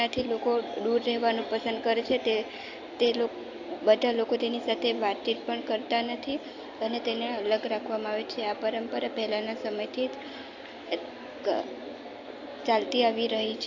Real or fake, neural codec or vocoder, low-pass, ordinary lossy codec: real; none; 7.2 kHz; AAC, 48 kbps